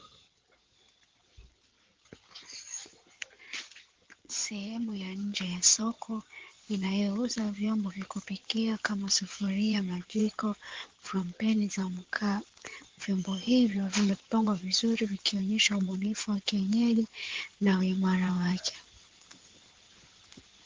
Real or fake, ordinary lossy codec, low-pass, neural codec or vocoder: fake; Opus, 16 kbps; 7.2 kHz; codec, 16 kHz in and 24 kHz out, 2.2 kbps, FireRedTTS-2 codec